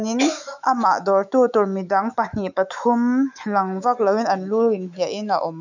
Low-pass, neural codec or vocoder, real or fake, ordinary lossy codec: 7.2 kHz; autoencoder, 48 kHz, 128 numbers a frame, DAC-VAE, trained on Japanese speech; fake; none